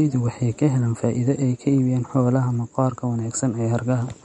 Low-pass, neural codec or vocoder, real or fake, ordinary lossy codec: 10.8 kHz; none; real; MP3, 48 kbps